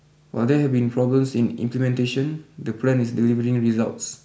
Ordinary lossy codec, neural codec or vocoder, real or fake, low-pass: none; none; real; none